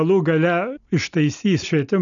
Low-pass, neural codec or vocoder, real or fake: 7.2 kHz; none; real